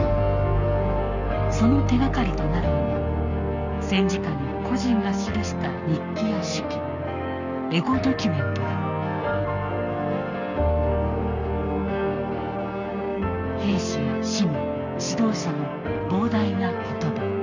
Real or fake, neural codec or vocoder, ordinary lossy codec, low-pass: fake; codec, 44.1 kHz, 7.8 kbps, Pupu-Codec; none; 7.2 kHz